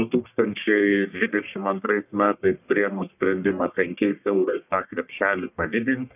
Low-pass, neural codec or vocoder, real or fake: 3.6 kHz; codec, 44.1 kHz, 1.7 kbps, Pupu-Codec; fake